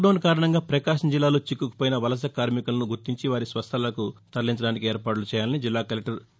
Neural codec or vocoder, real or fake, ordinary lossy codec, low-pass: none; real; none; none